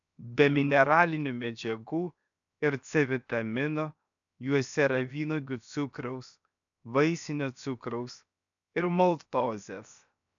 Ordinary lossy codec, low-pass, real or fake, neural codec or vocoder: MP3, 96 kbps; 7.2 kHz; fake; codec, 16 kHz, 0.7 kbps, FocalCodec